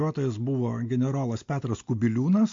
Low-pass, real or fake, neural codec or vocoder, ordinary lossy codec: 7.2 kHz; real; none; MP3, 48 kbps